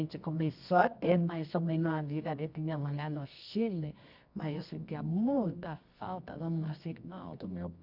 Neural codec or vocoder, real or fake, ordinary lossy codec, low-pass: codec, 24 kHz, 0.9 kbps, WavTokenizer, medium music audio release; fake; none; 5.4 kHz